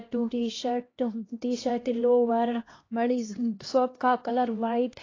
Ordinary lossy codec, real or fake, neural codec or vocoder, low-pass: AAC, 32 kbps; fake; codec, 16 kHz, 1 kbps, X-Codec, HuBERT features, trained on LibriSpeech; 7.2 kHz